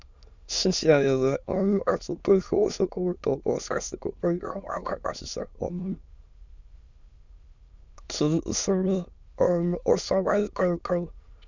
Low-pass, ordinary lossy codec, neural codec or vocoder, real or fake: 7.2 kHz; Opus, 64 kbps; autoencoder, 22.05 kHz, a latent of 192 numbers a frame, VITS, trained on many speakers; fake